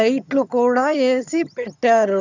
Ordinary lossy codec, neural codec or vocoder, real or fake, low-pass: none; vocoder, 22.05 kHz, 80 mel bands, HiFi-GAN; fake; 7.2 kHz